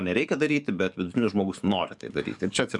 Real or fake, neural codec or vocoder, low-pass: fake; codec, 44.1 kHz, 7.8 kbps, DAC; 10.8 kHz